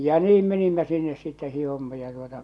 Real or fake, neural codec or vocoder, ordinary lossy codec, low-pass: real; none; none; none